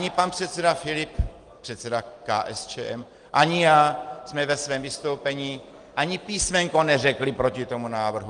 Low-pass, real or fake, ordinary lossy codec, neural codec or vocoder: 9.9 kHz; real; Opus, 24 kbps; none